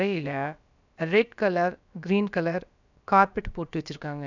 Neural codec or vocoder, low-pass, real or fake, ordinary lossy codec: codec, 16 kHz, about 1 kbps, DyCAST, with the encoder's durations; 7.2 kHz; fake; none